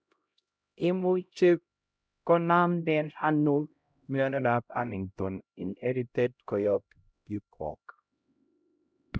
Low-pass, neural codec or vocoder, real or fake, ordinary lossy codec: none; codec, 16 kHz, 0.5 kbps, X-Codec, HuBERT features, trained on LibriSpeech; fake; none